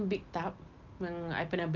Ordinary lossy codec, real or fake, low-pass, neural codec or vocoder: Opus, 24 kbps; real; 7.2 kHz; none